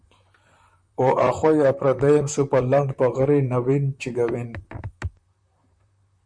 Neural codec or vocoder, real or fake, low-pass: vocoder, 44.1 kHz, 128 mel bands, Pupu-Vocoder; fake; 9.9 kHz